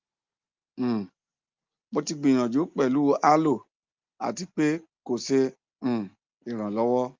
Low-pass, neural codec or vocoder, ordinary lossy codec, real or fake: 7.2 kHz; none; Opus, 32 kbps; real